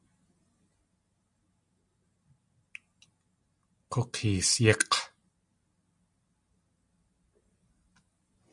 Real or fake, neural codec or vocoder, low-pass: real; none; 10.8 kHz